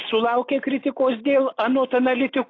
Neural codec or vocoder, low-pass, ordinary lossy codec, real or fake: none; 7.2 kHz; AAC, 32 kbps; real